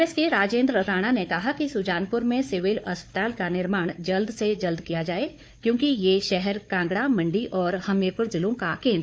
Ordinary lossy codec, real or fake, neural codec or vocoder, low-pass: none; fake; codec, 16 kHz, 4 kbps, FunCodec, trained on Chinese and English, 50 frames a second; none